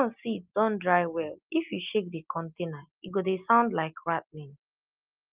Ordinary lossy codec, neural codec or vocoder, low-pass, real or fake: Opus, 24 kbps; none; 3.6 kHz; real